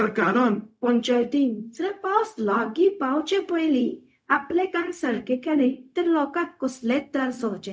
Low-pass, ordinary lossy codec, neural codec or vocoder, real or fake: none; none; codec, 16 kHz, 0.4 kbps, LongCat-Audio-Codec; fake